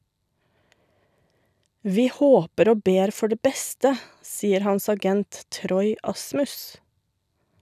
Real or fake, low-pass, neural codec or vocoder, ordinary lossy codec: real; 14.4 kHz; none; none